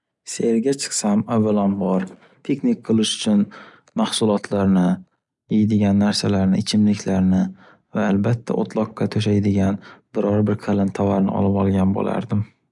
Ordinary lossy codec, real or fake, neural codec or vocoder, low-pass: none; real; none; 10.8 kHz